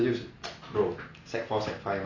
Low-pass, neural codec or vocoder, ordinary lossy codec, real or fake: 7.2 kHz; none; none; real